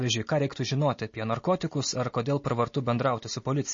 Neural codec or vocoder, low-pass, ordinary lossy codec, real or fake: none; 7.2 kHz; MP3, 32 kbps; real